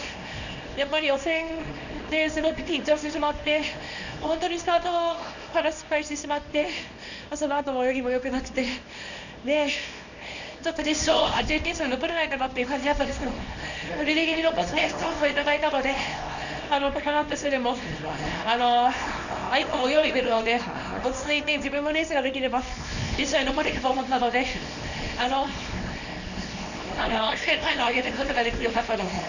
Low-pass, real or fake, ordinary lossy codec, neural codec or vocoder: 7.2 kHz; fake; none; codec, 24 kHz, 0.9 kbps, WavTokenizer, small release